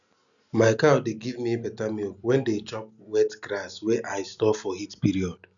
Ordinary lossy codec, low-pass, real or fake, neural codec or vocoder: none; 7.2 kHz; real; none